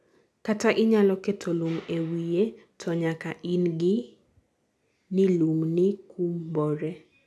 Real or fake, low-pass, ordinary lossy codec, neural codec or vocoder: real; none; none; none